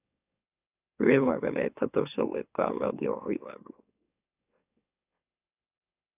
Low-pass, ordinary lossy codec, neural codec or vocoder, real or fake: 3.6 kHz; AAC, 32 kbps; autoencoder, 44.1 kHz, a latent of 192 numbers a frame, MeloTTS; fake